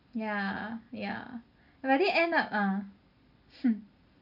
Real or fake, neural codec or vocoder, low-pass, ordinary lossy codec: real; none; 5.4 kHz; none